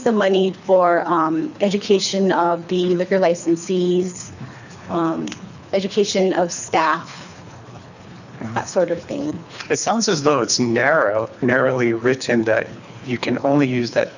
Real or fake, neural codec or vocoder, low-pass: fake; codec, 24 kHz, 3 kbps, HILCodec; 7.2 kHz